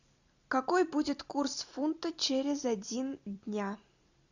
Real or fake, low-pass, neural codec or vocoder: fake; 7.2 kHz; vocoder, 44.1 kHz, 128 mel bands every 256 samples, BigVGAN v2